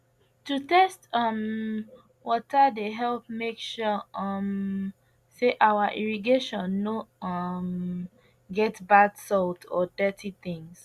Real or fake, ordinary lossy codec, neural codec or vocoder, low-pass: real; Opus, 64 kbps; none; 14.4 kHz